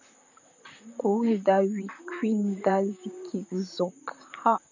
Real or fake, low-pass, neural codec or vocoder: fake; 7.2 kHz; vocoder, 44.1 kHz, 128 mel bands, Pupu-Vocoder